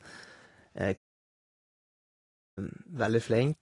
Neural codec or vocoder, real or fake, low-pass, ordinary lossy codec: none; real; 10.8 kHz; AAC, 32 kbps